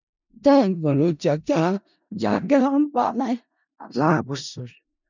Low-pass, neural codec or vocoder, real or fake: 7.2 kHz; codec, 16 kHz in and 24 kHz out, 0.4 kbps, LongCat-Audio-Codec, four codebook decoder; fake